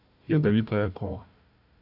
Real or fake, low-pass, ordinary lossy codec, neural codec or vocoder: fake; 5.4 kHz; none; codec, 16 kHz, 1 kbps, FunCodec, trained on Chinese and English, 50 frames a second